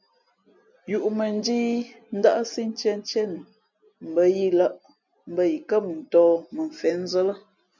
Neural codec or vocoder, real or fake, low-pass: none; real; 7.2 kHz